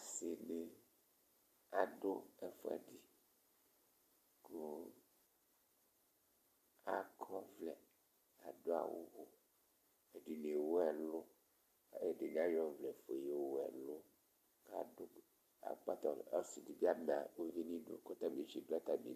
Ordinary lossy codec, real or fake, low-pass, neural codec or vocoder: MP3, 64 kbps; real; 14.4 kHz; none